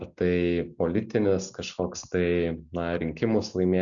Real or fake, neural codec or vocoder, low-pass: real; none; 7.2 kHz